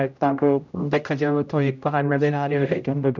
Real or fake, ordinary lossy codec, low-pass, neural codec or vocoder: fake; none; 7.2 kHz; codec, 16 kHz, 0.5 kbps, X-Codec, HuBERT features, trained on general audio